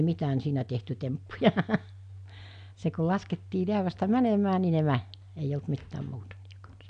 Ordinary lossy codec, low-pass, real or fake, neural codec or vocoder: none; 9.9 kHz; real; none